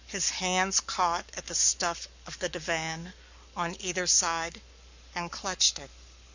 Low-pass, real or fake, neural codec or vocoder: 7.2 kHz; fake; codec, 44.1 kHz, 7.8 kbps, Pupu-Codec